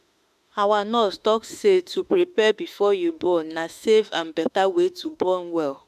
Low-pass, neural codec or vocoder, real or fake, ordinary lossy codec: 14.4 kHz; autoencoder, 48 kHz, 32 numbers a frame, DAC-VAE, trained on Japanese speech; fake; MP3, 96 kbps